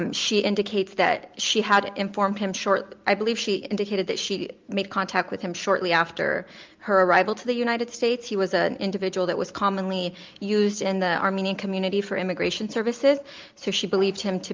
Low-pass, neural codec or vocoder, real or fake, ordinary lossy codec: 7.2 kHz; none; real; Opus, 24 kbps